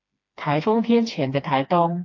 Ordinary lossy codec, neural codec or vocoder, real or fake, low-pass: AAC, 48 kbps; codec, 16 kHz, 2 kbps, FreqCodec, smaller model; fake; 7.2 kHz